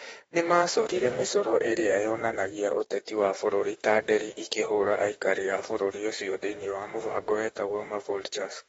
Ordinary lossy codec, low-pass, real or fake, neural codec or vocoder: AAC, 24 kbps; 19.8 kHz; fake; autoencoder, 48 kHz, 32 numbers a frame, DAC-VAE, trained on Japanese speech